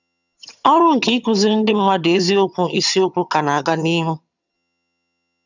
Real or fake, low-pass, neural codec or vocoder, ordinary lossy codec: fake; 7.2 kHz; vocoder, 22.05 kHz, 80 mel bands, HiFi-GAN; none